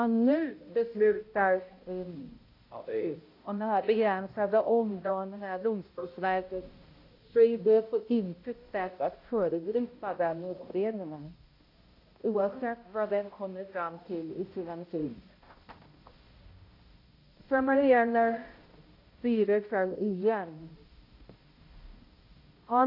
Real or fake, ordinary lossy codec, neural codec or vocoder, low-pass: fake; AAC, 32 kbps; codec, 16 kHz, 0.5 kbps, X-Codec, HuBERT features, trained on balanced general audio; 5.4 kHz